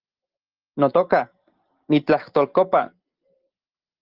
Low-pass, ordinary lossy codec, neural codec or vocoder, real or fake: 5.4 kHz; Opus, 16 kbps; none; real